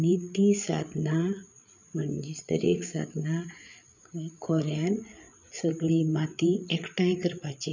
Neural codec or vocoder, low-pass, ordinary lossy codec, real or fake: codec, 16 kHz, 8 kbps, FreqCodec, larger model; 7.2 kHz; none; fake